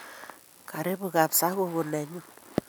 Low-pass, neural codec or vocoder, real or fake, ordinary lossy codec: none; none; real; none